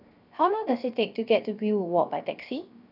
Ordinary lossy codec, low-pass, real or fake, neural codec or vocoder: none; 5.4 kHz; fake; codec, 16 kHz, 0.7 kbps, FocalCodec